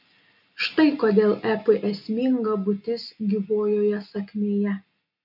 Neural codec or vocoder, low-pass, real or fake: none; 5.4 kHz; real